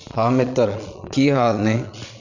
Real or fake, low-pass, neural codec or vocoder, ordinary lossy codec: fake; 7.2 kHz; vocoder, 22.05 kHz, 80 mel bands, Vocos; none